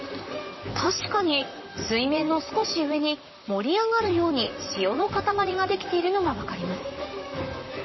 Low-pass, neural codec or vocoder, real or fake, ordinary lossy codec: 7.2 kHz; vocoder, 44.1 kHz, 128 mel bands, Pupu-Vocoder; fake; MP3, 24 kbps